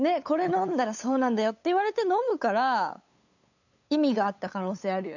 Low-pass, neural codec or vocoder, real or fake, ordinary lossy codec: 7.2 kHz; codec, 16 kHz, 8 kbps, FunCodec, trained on LibriTTS, 25 frames a second; fake; none